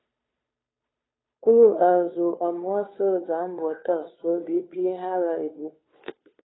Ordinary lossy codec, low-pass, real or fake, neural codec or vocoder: AAC, 16 kbps; 7.2 kHz; fake; codec, 16 kHz, 2 kbps, FunCodec, trained on Chinese and English, 25 frames a second